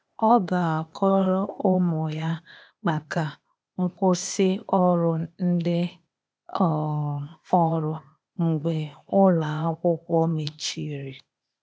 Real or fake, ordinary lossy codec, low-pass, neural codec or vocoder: fake; none; none; codec, 16 kHz, 0.8 kbps, ZipCodec